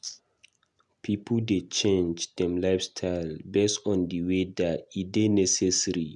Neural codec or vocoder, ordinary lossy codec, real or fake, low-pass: none; none; real; 10.8 kHz